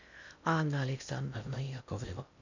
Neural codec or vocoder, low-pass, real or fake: codec, 16 kHz in and 24 kHz out, 0.6 kbps, FocalCodec, streaming, 2048 codes; 7.2 kHz; fake